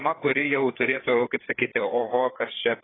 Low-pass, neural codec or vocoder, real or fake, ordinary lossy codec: 7.2 kHz; codec, 16 kHz, 4 kbps, FreqCodec, larger model; fake; AAC, 16 kbps